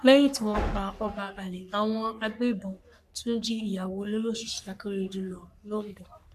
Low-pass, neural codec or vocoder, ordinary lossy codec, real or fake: 14.4 kHz; codec, 44.1 kHz, 3.4 kbps, Pupu-Codec; none; fake